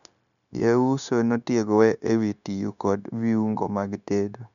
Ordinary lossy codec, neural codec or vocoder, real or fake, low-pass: none; codec, 16 kHz, 0.9 kbps, LongCat-Audio-Codec; fake; 7.2 kHz